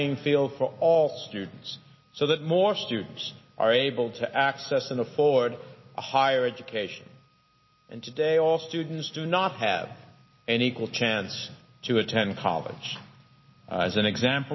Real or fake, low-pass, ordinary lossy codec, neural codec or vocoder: real; 7.2 kHz; MP3, 24 kbps; none